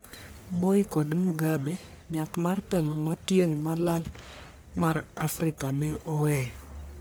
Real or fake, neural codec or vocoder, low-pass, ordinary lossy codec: fake; codec, 44.1 kHz, 1.7 kbps, Pupu-Codec; none; none